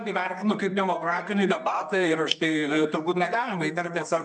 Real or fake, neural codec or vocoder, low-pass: fake; codec, 24 kHz, 0.9 kbps, WavTokenizer, medium music audio release; 10.8 kHz